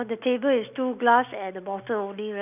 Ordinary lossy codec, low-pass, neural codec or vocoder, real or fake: none; 3.6 kHz; none; real